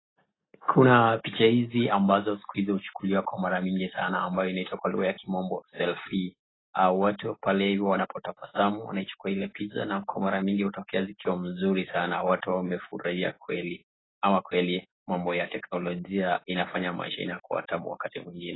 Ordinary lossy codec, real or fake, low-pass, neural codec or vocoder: AAC, 16 kbps; real; 7.2 kHz; none